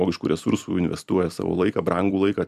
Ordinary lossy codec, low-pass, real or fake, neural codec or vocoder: MP3, 96 kbps; 14.4 kHz; fake; vocoder, 44.1 kHz, 128 mel bands every 256 samples, BigVGAN v2